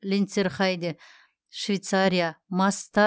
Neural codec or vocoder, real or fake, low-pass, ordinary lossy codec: none; real; none; none